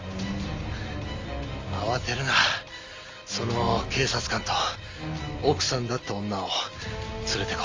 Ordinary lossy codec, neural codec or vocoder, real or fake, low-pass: Opus, 32 kbps; none; real; 7.2 kHz